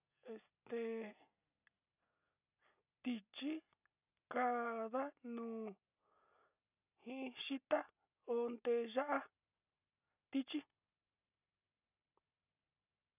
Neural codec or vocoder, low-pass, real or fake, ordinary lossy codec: none; 3.6 kHz; real; none